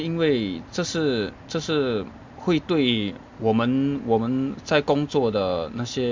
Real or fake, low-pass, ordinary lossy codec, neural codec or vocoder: real; 7.2 kHz; MP3, 64 kbps; none